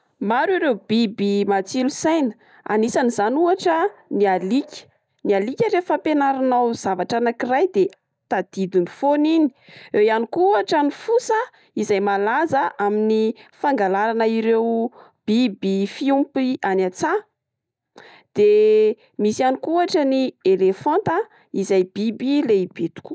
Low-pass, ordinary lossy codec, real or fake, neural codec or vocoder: none; none; real; none